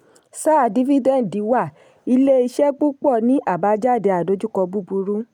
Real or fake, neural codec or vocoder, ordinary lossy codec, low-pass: real; none; none; 19.8 kHz